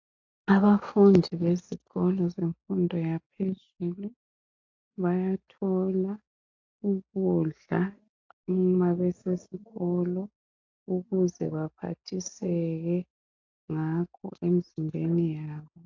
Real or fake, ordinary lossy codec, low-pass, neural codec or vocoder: real; AAC, 48 kbps; 7.2 kHz; none